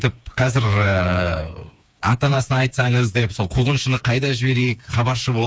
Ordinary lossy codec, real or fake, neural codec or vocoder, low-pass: none; fake; codec, 16 kHz, 4 kbps, FreqCodec, smaller model; none